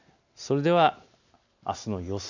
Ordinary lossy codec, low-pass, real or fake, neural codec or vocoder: none; 7.2 kHz; real; none